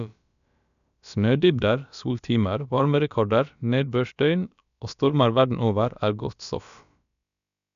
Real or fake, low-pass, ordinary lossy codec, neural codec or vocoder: fake; 7.2 kHz; MP3, 96 kbps; codec, 16 kHz, about 1 kbps, DyCAST, with the encoder's durations